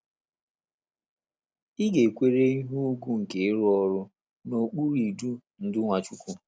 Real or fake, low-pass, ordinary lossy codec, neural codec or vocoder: real; none; none; none